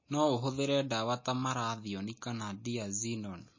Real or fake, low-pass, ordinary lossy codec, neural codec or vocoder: real; 7.2 kHz; MP3, 32 kbps; none